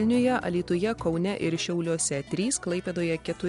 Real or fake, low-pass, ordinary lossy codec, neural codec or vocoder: real; 10.8 kHz; MP3, 64 kbps; none